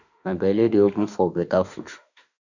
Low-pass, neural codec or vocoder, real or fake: 7.2 kHz; autoencoder, 48 kHz, 32 numbers a frame, DAC-VAE, trained on Japanese speech; fake